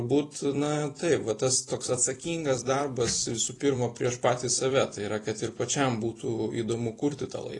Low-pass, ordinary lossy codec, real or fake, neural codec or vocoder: 10.8 kHz; AAC, 32 kbps; fake; vocoder, 44.1 kHz, 128 mel bands every 256 samples, BigVGAN v2